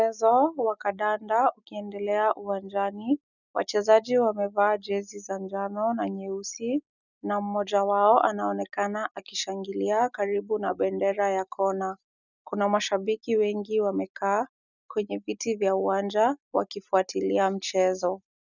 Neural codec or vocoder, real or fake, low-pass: none; real; 7.2 kHz